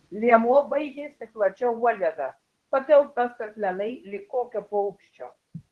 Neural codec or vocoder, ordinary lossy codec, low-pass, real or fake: codec, 24 kHz, 0.9 kbps, WavTokenizer, medium speech release version 2; Opus, 16 kbps; 10.8 kHz; fake